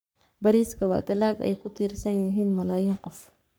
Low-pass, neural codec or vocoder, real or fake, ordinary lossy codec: none; codec, 44.1 kHz, 3.4 kbps, Pupu-Codec; fake; none